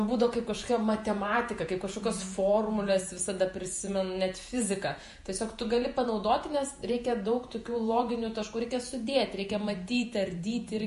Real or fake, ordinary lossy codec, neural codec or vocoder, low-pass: fake; MP3, 48 kbps; vocoder, 48 kHz, 128 mel bands, Vocos; 14.4 kHz